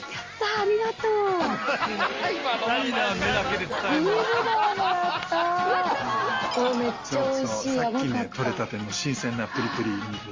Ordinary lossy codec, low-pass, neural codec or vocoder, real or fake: Opus, 32 kbps; 7.2 kHz; none; real